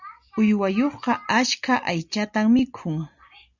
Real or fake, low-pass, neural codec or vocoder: real; 7.2 kHz; none